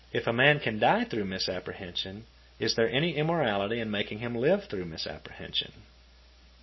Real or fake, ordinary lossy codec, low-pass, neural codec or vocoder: real; MP3, 24 kbps; 7.2 kHz; none